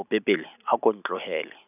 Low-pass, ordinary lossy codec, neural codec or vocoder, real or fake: 3.6 kHz; none; none; real